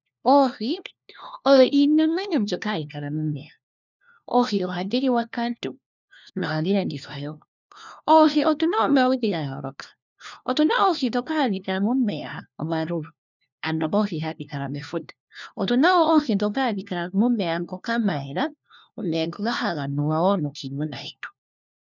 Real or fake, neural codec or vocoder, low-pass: fake; codec, 16 kHz, 1 kbps, FunCodec, trained on LibriTTS, 50 frames a second; 7.2 kHz